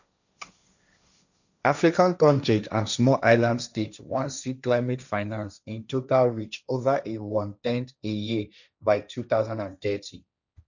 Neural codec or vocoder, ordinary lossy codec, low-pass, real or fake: codec, 16 kHz, 1.1 kbps, Voila-Tokenizer; none; 7.2 kHz; fake